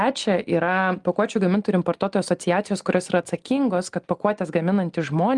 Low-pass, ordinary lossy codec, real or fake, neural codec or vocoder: 10.8 kHz; Opus, 32 kbps; real; none